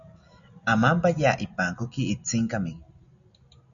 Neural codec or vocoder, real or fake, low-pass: none; real; 7.2 kHz